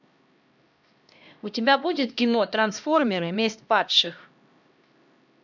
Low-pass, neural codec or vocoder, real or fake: 7.2 kHz; codec, 16 kHz, 1 kbps, X-Codec, HuBERT features, trained on LibriSpeech; fake